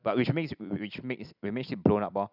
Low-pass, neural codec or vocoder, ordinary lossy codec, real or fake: 5.4 kHz; none; none; real